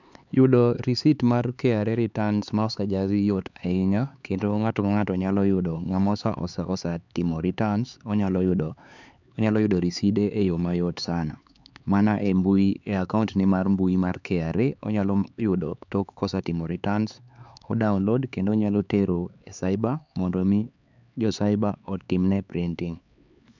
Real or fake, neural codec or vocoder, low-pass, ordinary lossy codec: fake; codec, 16 kHz, 4 kbps, X-Codec, HuBERT features, trained on LibriSpeech; 7.2 kHz; none